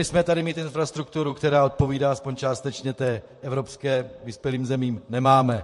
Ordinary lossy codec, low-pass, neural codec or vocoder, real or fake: MP3, 48 kbps; 14.4 kHz; vocoder, 44.1 kHz, 128 mel bands, Pupu-Vocoder; fake